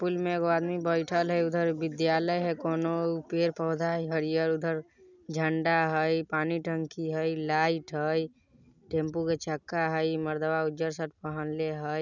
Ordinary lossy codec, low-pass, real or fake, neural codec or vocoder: none; 7.2 kHz; real; none